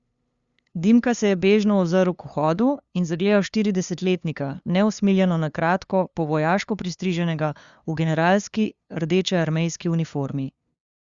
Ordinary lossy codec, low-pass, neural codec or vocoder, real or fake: Opus, 64 kbps; 7.2 kHz; codec, 16 kHz, 2 kbps, FunCodec, trained on LibriTTS, 25 frames a second; fake